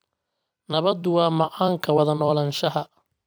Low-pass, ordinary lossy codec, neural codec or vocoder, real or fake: none; none; vocoder, 44.1 kHz, 128 mel bands every 256 samples, BigVGAN v2; fake